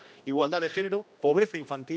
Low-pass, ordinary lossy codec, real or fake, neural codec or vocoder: none; none; fake; codec, 16 kHz, 1 kbps, X-Codec, HuBERT features, trained on general audio